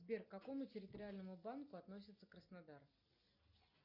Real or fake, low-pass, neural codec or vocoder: real; 5.4 kHz; none